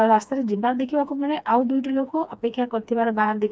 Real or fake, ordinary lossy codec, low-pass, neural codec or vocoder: fake; none; none; codec, 16 kHz, 2 kbps, FreqCodec, smaller model